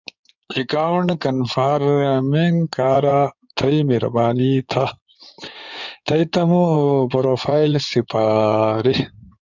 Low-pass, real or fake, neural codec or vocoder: 7.2 kHz; fake; codec, 16 kHz in and 24 kHz out, 2.2 kbps, FireRedTTS-2 codec